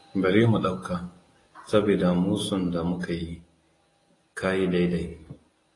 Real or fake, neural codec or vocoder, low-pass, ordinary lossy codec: real; none; 10.8 kHz; AAC, 32 kbps